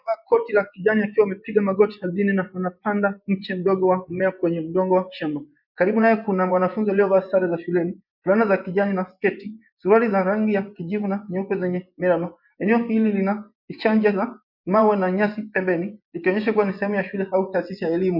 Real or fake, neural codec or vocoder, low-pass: fake; vocoder, 24 kHz, 100 mel bands, Vocos; 5.4 kHz